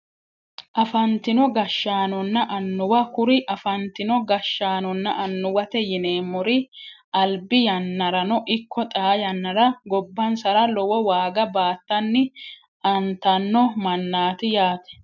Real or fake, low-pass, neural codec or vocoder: real; 7.2 kHz; none